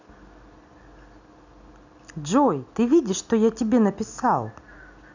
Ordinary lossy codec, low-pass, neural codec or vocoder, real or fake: none; 7.2 kHz; none; real